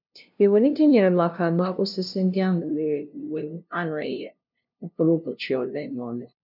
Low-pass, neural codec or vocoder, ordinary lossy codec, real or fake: 5.4 kHz; codec, 16 kHz, 0.5 kbps, FunCodec, trained on LibriTTS, 25 frames a second; none; fake